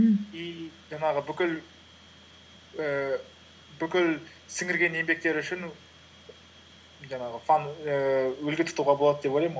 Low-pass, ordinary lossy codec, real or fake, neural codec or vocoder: none; none; real; none